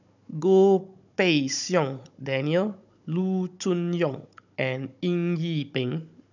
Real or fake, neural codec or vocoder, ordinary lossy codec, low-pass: fake; codec, 16 kHz, 16 kbps, FunCodec, trained on Chinese and English, 50 frames a second; none; 7.2 kHz